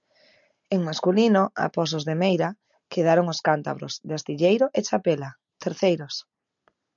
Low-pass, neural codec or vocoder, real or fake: 7.2 kHz; none; real